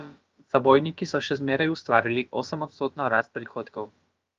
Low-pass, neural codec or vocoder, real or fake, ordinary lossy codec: 7.2 kHz; codec, 16 kHz, about 1 kbps, DyCAST, with the encoder's durations; fake; Opus, 32 kbps